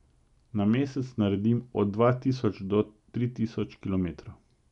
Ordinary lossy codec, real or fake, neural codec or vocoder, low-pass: none; real; none; 10.8 kHz